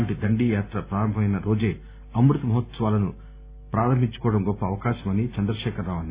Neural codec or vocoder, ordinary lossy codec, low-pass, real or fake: none; Opus, 64 kbps; 3.6 kHz; real